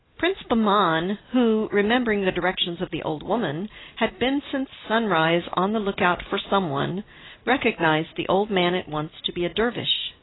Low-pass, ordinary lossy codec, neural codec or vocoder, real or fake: 7.2 kHz; AAC, 16 kbps; none; real